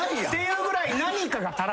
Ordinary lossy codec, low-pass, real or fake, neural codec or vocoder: none; none; real; none